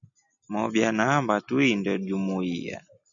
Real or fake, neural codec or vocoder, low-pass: real; none; 7.2 kHz